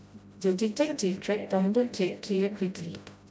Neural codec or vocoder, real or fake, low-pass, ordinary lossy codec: codec, 16 kHz, 0.5 kbps, FreqCodec, smaller model; fake; none; none